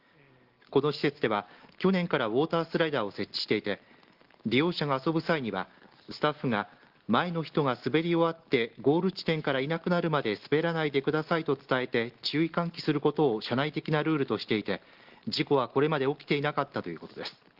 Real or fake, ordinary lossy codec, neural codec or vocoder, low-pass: real; Opus, 16 kbps; none; 5.4 kHz